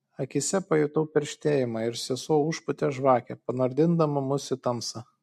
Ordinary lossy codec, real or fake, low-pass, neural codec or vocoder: MP3, 64 kbps; real; 14.4 kHz; none